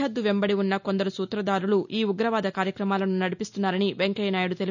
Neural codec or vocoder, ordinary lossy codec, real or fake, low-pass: none; none; real; 7.2 kHz